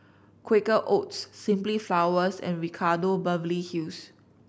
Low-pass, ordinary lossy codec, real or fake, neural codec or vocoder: none; none; real; none